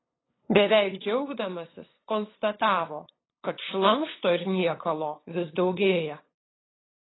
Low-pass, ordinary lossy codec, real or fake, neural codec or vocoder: 7.2 kHz; AAC, 16 kbps; fake; codec, 16 kHz, 8 kbps, FunCodec, trained on LibriTTS, 25 frames a second